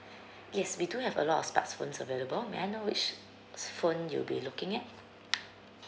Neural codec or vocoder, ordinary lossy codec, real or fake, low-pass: none; none; real; none